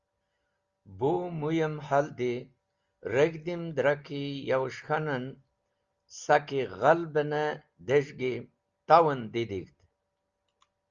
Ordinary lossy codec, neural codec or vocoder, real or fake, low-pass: Opus, 32 kbps; none; real; 7.2 kHz